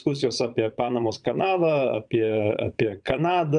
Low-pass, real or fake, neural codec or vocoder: 9.9 kHz; fake; vocoder, 22.05 kHz, 80 mel bands, Vocos